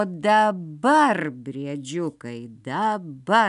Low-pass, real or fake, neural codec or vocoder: 10.8 kHz; real; none